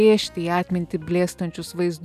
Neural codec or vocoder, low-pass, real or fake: none; 14.4 kHz; real